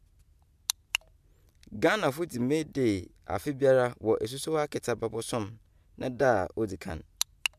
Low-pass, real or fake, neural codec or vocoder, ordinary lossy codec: 14.4 kHz; real; none; MP3, 96 kbps